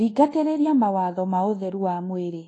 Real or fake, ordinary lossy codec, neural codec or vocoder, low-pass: fake; AAC, 32 kbps; codec, 24 kHz, 1.2 kbps, DualCodec; 10.8 kHz